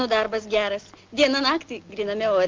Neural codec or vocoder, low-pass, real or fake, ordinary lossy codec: none; 7.2 kHz; real; Opus, 24 kbps